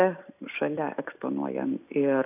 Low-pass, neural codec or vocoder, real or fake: 3.6 kHz; vocoder, 44.1 kHz, 128 mel bands every 256 samples, BigVGAN v2; fake